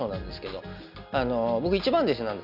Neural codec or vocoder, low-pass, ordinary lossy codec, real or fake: none; 5.4 kHz; none; real